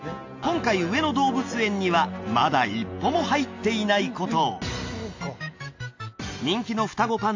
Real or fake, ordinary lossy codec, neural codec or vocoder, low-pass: real; AAC, 48 kbps; none; 7.2 kHz